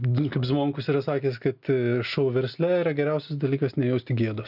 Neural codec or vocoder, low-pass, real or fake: none; 5.4 kHz; real